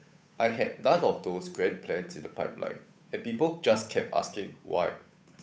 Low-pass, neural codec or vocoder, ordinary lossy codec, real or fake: none; codec, 16 kHz, 8 kbps, FunCodec, trained on Chinese and English, 25 frames a second; none; fake